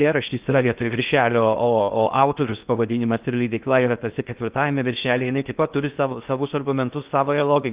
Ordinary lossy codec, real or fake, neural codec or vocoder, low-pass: Opus, 32 kbps; fake; codec, 16 kHz in and 24 kHz out, 0.6 kbps, FocalCodec, streaming, 2048 codes; 3.6 kHz